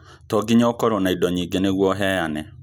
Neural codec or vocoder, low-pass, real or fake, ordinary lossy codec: none; none; real; none